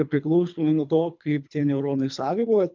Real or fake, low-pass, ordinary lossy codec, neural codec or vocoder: fake; 7.2 kHz; MP3, 64 kbps; codec, 24 kHz, 3 kbps, HILCodec